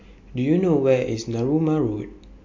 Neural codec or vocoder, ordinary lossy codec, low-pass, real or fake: none; MP3, 48 kbps; 7.2 kHz; real